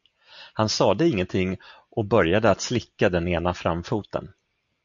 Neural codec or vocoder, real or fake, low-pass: none; real; 7.2 kHz